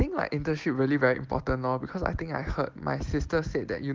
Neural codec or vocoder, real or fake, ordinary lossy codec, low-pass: none; real; Opus, 16 kbps; 7.2 kHz